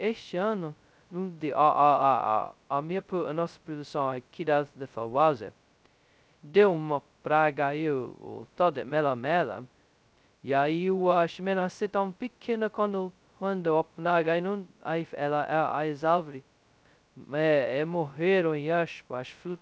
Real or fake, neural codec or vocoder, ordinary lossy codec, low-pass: fake; codec, 16 kHz, 0.2 kbps, FocalCodec; none; none